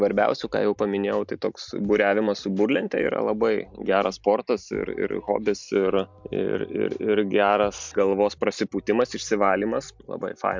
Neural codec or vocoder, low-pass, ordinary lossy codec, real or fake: none; 7.2 kHz; MP3, 64 kbps; real